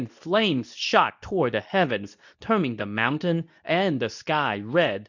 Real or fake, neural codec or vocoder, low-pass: fake; codec, 24 kHz, 0.9 kbps, WavTokenizer, medium speech release version 2; 7.2 kHz